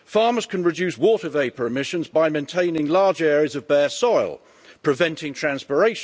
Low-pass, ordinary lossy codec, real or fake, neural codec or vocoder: none; none; real; none